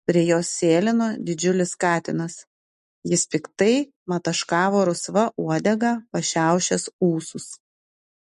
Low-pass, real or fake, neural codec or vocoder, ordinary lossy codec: 14.4 kHz; real; none; MP3, 48 kbps